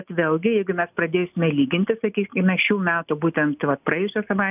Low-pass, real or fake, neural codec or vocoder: 3.6 kHz; real; none